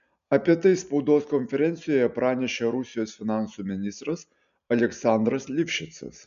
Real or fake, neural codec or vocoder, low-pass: real; none; 7.2 kHz